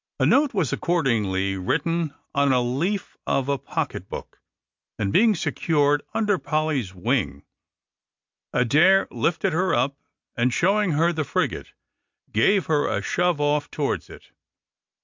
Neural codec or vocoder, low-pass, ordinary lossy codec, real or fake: none; 7.2 kHz; MP3, 64 kbps; real